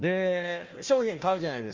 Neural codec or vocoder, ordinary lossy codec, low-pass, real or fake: codec, 16 kHz, 1 kbps, FunCodec, trained on Chinese and English, 50 frames a second; Opus, 32 kbps; 7.2 kHz; fake